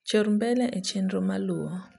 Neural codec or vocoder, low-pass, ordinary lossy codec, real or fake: none; 10.8 kHz; none; real